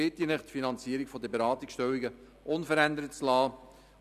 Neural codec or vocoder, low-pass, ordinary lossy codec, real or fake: none; 14.4 kHz; none; real